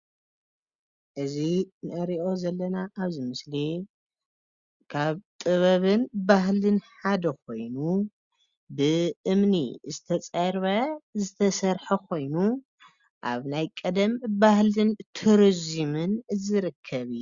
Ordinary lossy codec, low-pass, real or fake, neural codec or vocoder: Opus, 64 kbps; 7.2 kHz; real; none